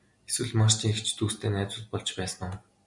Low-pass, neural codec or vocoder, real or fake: 10.8 kHz; none; real